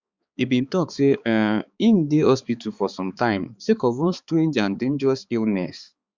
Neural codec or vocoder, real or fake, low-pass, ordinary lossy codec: codec, 16 kHz, 4 kbps, X-Codec, HuBERT features, trained on balanced general audio; fake; 7.2 kHz; Opus, 64 kbps